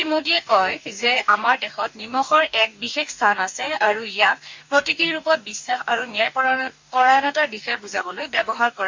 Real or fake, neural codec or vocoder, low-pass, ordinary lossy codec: fake; codec, 44.1 kHz, 2.6 kbps, DAC; 7.2 kHz; MP3, 64 kbps